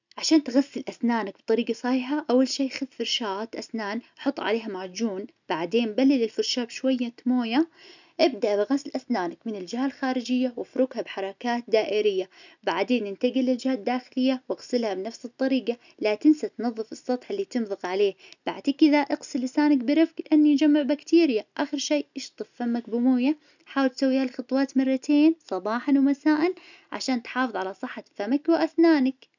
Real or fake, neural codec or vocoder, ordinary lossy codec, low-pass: real; none; none; 7.2 kHz